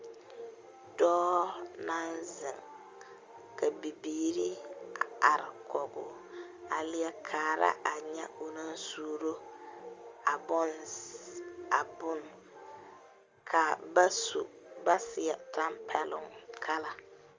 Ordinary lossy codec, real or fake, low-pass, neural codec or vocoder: Opus, 32 kbps; real; 7.2 kHz; none